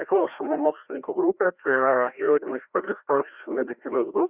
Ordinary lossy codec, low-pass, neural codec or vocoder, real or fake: Opus, 64 kbps; 3.6 kHz; codec, 16 kHz, 1 kbps, FreqCodec, larger model; fake